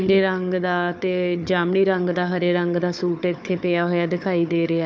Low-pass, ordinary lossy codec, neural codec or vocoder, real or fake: none; none; codec, 16 kHz, 4 kbps, FunCodec, trained on Chinese and English, 50 frames a second; fake